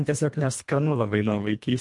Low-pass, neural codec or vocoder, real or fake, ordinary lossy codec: 10.8 kHz; codec, 24 kHz, 1.5 kbps, HILCodec; fake; MP3, 64 kbps